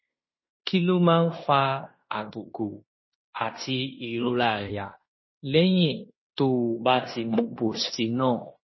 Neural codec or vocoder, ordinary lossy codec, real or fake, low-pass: codec, 16 kHz in and 24 kHz out, 0.9 kbps, LongCat-Audio-Codec, fine tuned four codebook decoder; MP3, 24 kbps; fake; 7.2 kHz